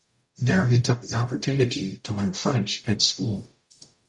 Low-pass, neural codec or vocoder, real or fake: 10.8 kHz; codec, 44.1 kHz, 0.9 kbps, DAC; fake